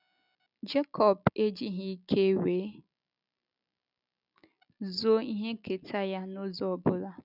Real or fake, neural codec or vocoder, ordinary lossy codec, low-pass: real; none; none; 5.4 kHz